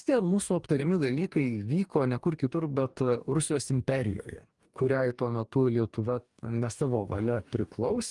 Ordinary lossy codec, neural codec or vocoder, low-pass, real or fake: Opus, 32 kbps; codec, 44.1 kHz, 2.6 kbps, DAC; 10.8 kHz; fake